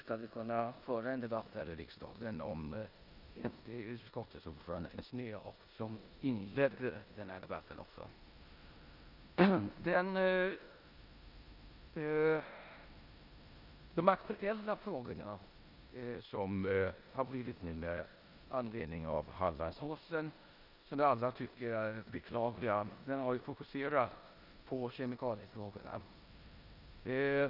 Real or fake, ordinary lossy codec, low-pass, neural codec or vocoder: fake; none; 5.4 kHz; codec, 16 kHz in and 24 kHz out, 0.9 kbps, LongCat-Audio-Codec, four codebook decoder